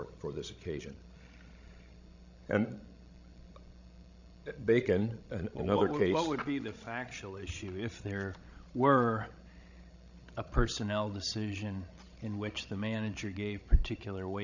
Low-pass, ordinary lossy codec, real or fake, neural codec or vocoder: 7.2 kHz; Opus, 64 kbps; fake; codec, 16 kHz, 16 kbps, FreqCodec, larger model